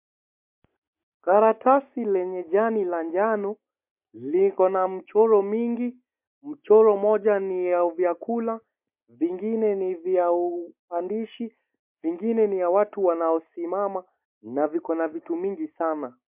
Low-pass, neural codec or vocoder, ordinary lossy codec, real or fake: 3.6 kHz; none; MP3, 32 kbps; real